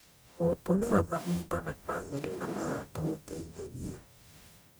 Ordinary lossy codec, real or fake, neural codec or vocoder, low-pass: none; fake; codec, 44.1 kHz, 0.9 kbps, DAC; none